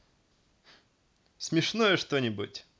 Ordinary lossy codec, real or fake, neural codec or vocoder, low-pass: none; real; none; none